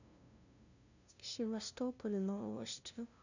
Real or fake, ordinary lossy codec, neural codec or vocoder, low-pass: fake; none; codec, 16 kHz, 0.5 kbps, FunCodec, trained on LibriTTS, 25 frames a second; 7.2 kHz